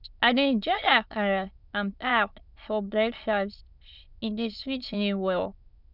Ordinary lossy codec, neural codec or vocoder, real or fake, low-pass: none; autoencoder, 22.05 kHz, a latent of 192 numbers a frame, VITS, trained on many speakers; fake; 5.4 kHz